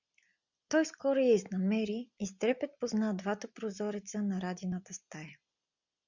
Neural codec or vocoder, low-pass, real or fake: none; 7.2 kHz; real